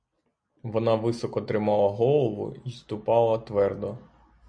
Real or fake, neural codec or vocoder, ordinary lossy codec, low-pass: real; none; MP3, 64 kbps; 9.9 kHz